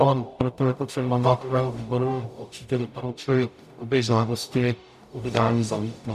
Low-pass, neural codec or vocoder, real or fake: 14.4 kHz; codec, 44.1 kHz, 0.9 kbps, DAC; fake